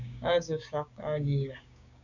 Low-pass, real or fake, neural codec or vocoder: 7.2 kHz; fake; codec, 16 kHz, 6 kbps, DAC